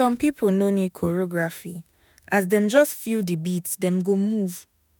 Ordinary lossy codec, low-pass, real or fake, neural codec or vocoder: none; none; fake; autoencoder, 48 kHz, 32 numbers a frame, DAC-VAE, trained on Japanese speech